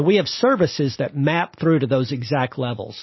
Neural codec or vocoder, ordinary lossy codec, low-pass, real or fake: none; MP3, 24 kbps; 7.2 kHz; real